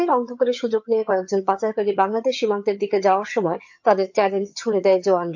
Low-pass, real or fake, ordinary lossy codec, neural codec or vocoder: 7.2 kHz; fake; MP3, 48 kbps; vocoder, 22.05 kHz, 80 mel bands, HiFi-GAN